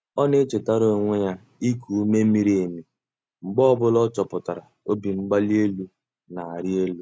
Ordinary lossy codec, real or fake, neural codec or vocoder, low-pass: none; real; none; none